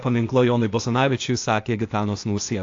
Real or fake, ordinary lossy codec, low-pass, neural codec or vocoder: fake; AAC, 48 kbps; 7.2 kHz; codec, 16 kHz, 0.8 kbps, ZipCodec